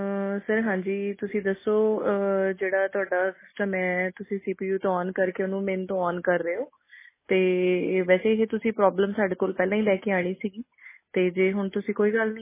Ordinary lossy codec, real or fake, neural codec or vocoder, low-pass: MP3, 16 kbps; real; none; 3.6 kHz